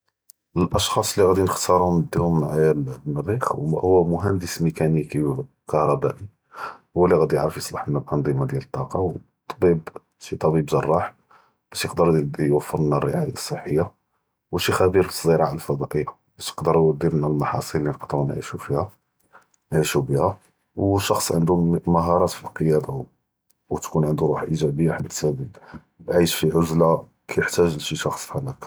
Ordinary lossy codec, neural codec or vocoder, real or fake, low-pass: none; none; real; none